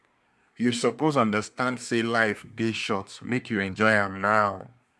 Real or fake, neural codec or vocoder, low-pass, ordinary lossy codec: fake; codec, 24 kHz, 1 kbps, SNAC; none; none